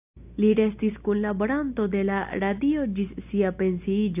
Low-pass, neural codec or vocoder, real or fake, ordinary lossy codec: 3.6 kHz; none; real; AAC, 32 kbps